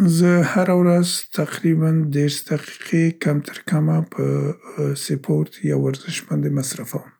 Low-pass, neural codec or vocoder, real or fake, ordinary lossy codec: none; none; real; none